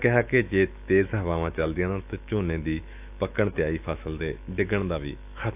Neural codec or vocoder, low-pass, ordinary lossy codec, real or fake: autoencoder, 48 kHz, 128 numbers a frame, DAC-VAE, trained on Japanese speech; 3.6 kHz; AAC, 32 kbps; fake